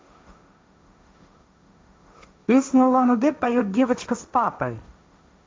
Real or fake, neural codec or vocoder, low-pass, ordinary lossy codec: fake; codec, 16 kHz, 1.1 kbps, Voila-Tokenizer; none; none